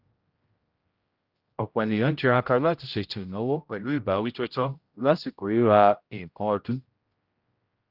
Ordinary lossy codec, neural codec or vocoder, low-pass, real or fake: Opus, 24 kbps; codec, 16 kHz, 0.5 kbps, X-Codec, HuBERT features, trained on general audio; 5.4 kHz; fake